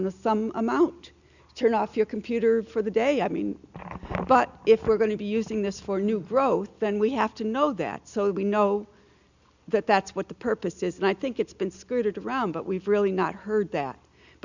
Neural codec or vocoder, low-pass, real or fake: none; 7.2 kHz; real